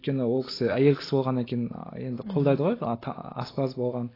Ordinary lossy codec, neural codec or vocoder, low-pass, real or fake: AAC, 24 kbps; none; 5.4 kHz; real